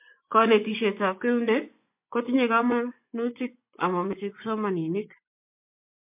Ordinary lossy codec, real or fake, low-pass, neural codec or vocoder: MP3, 32 kbps; fake; 3.6 kHz; vocoder, 24 kHz, 100 mel bands, Vocos